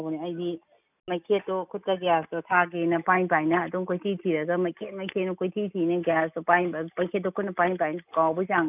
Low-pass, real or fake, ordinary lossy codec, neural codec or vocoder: 3.6 kHz; real; none; none